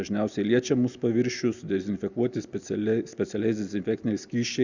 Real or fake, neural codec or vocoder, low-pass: real; none; 7.2 kHz